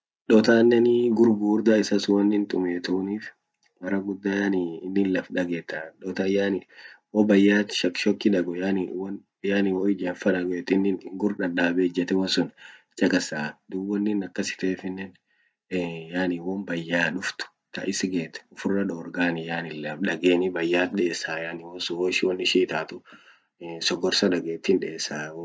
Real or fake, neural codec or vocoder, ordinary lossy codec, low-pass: real; none; none; none